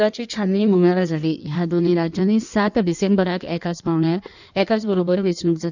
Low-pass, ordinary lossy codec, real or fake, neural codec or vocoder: 7.2 kHz; none; fake; codec, 16 kHz in and 24 kHz out, 1.1 kbps, FireRedTTS-2 codec